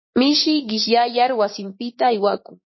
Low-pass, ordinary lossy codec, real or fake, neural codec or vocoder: 7.2 kHz; MP3, 24 kbps; fake; codec, 16 kHz, 6 kbps, DAC